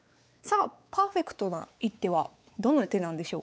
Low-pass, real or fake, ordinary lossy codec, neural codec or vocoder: none; fake; none; codec, 16 kHz, 4 kbps, X-Codec, WavLM features, trained on Multilingual LibriSpeech